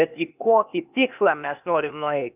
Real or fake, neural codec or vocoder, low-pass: fake; codec, 16 kHz, 0.8 kbps, ZipCodec; 3.6 kHz